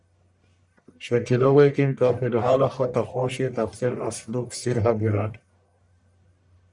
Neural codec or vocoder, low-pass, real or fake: codec, 44.1 kHz, 1.7 kbps, Pupu-Codec; 10.8 kHz; fake